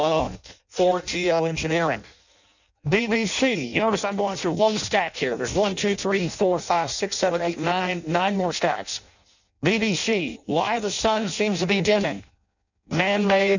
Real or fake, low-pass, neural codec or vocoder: fake; 7.2 kHz; codec, 16 kHz in and 24 kHz out, 0.6 kbps, FireRedTTS-2 codec